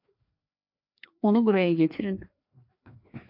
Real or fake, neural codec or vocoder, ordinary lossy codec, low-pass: fake; codec, 16 kHz, 2 kbps, FreqCodec, larger model; AAC, 48 kbps; 5.4 kHz